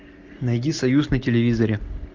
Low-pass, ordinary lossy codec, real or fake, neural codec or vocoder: 7.2 kHz; Opus, 32 kbps; real; none